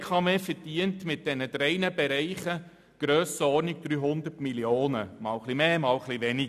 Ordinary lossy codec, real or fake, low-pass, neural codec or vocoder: none; real; 14.4 kHz; none